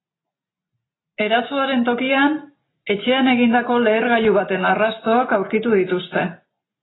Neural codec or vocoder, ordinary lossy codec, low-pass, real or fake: none; AAC, 16 kbps; 7.2 kHz; real